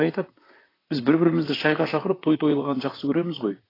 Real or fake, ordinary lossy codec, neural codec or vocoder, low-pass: fake; AAC, 24 kbps; vocoder, 44.1 kHz, 128 mel bands, Pupu-Vocoder; 5.4 kHz